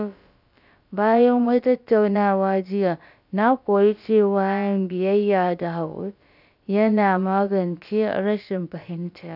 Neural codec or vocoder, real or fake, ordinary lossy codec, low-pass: codec, 16 kHz, about 1 kbps, DyCAST, with the encoder's durations; fake; none; 5.4 kHz